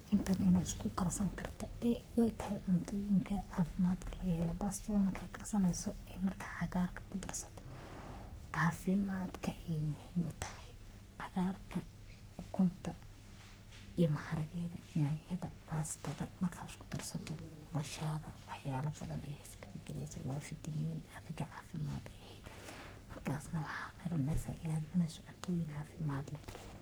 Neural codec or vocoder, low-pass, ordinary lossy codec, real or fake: codec, 44.1 kHz, 3.4 kbps, Pupu-Codec; none; none; fake